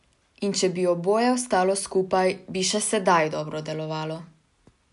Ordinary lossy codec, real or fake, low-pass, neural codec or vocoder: none; real; 10.8 kHz; none